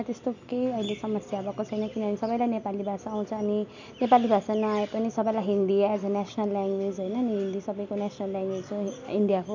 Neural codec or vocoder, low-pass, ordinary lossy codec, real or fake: none; 7.2 kHz; none; real